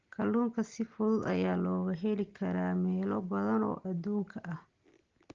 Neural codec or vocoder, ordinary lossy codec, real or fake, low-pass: none; Opus, 16 kbps; real; 7.2 kHz